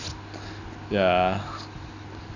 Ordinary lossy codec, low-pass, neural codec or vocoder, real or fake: none; 7.2 kHz; none; real